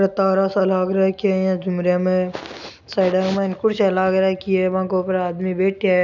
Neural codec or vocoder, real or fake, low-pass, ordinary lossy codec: none; real; 7.2 kHz; none